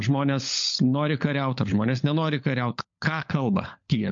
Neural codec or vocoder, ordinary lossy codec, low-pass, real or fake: codec, 16 kHz, 16 kbps, FunCodec, trained on LibriTTS, 50 frames a second; MP3, 48 kbps; 7.2 kHz; fake